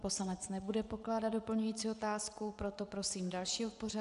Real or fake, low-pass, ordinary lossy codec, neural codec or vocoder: fake; 10.8 kHz; MP3, 96 kbps; vocoder, 24 kHz, 100 mel bands, Vocos